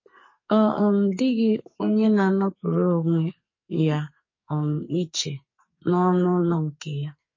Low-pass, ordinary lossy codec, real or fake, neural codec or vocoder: 7.2 kHz; MP3, 32 kbps; fake; codec, 44.1 kHz, 2.6 kbps, SNAC